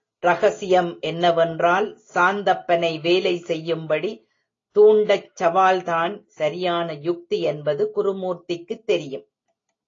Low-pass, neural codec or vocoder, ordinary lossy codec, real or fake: 7.2 kHz; none; AAC, 32 kbps; real